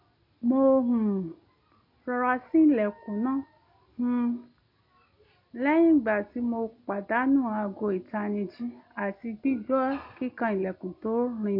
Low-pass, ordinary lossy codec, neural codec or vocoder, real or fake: 5.4 kHz; MP3, 32 kbps; none; real